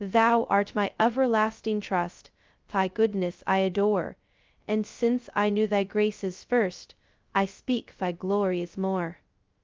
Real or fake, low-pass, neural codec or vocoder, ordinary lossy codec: fake; 7.2 kHz; codec, 16 kHz, 0.2 kbps, FocalCodec; Opus, 32 kbps